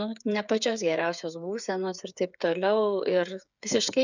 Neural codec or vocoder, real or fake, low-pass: codec, 16 kHz, 8 kbps, FreqCodec, smaller model; fake; 7.2 kHz